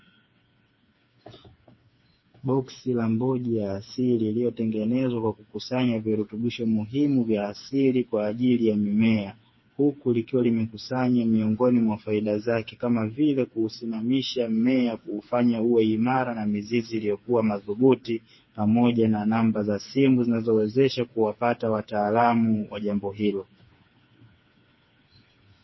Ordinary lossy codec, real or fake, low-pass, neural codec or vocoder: MP3, 24 kbps; fake; 7.2 kHz; codec, 16 kHz, 4 kbps, FreqCodec, smaller model